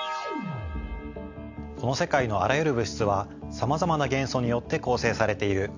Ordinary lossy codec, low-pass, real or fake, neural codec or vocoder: AAC, 48 kbps; 7.2 kHz; real; none